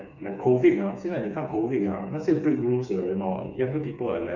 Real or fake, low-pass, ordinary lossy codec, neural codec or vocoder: fake; 7.2 kHz; none; codec, 16 kHz, 4 kbps, FreqCodec, smaller model